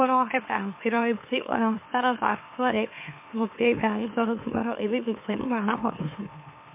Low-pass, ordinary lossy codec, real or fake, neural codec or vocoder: 3.6 kHz; MP3, 24 kbps; fake; autoencoder, 44.1 kHz, a latent of 192 numbers a frame, MeloTTS